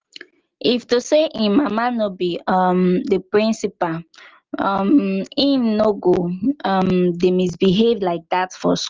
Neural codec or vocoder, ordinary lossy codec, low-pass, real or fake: none; Opus, 32 kbps; 7.2 kHz; real